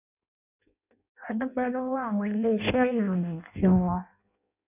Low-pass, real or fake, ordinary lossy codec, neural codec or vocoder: 3.6 kHz; fake; none; codec, 16 kHz in and 24 kHz out, 0.6 kbps, FireRedTTS-2 codec